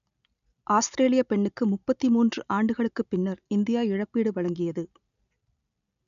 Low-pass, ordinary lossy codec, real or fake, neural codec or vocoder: 7.2 kHz; none; real; none